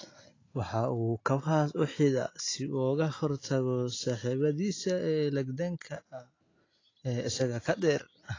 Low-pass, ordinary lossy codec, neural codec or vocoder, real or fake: 7.2 kHz; AAC, 32 kbps; none; real